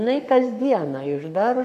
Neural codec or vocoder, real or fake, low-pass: codec, 44.1 kHz, 7.8 kbps, Pupu-Codec; fake; 14.4 kHz